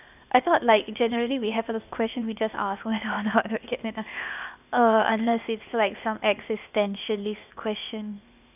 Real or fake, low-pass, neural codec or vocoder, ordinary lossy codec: fake; 3.6 kHz; codec, 16 kHz, 0.8 kbps, ZipCodec; none